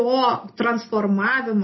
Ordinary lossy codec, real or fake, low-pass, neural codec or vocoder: MP3, 24 kbps; real; 7.2 kHz; none